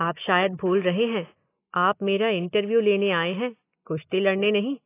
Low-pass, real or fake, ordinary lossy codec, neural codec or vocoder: 3.6 kHz; real; AAC, 24 kbps; none